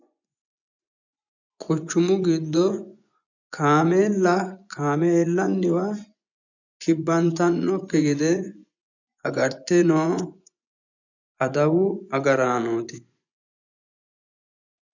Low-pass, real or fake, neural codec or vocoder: 7.2 kHz; fake; vocoder, 24 kHz, 100 mel bands, Vocos